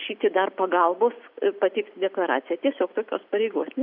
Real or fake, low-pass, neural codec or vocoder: real; 5.4 kHz; none